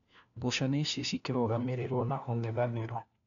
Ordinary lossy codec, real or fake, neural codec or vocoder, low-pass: none; fake; codec, 16 kHz, 1 kbps, FunCodec, trained on LibriTTS, 50 frames a second; 7.2 kHz